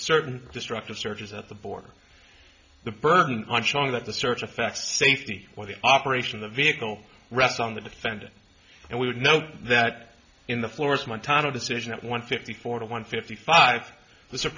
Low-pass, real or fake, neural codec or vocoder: 7.2 kHz; real; none